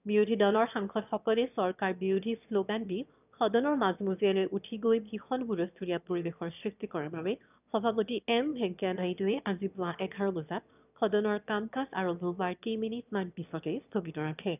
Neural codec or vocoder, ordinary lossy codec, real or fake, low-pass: autoencoder, 22.05 kHz, a latent of 192 numbers a frame, VITS, trained on one speaker; none; fake; 3.6 kHz